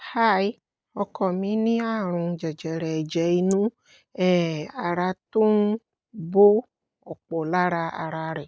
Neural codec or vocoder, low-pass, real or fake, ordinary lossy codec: none; none; real; none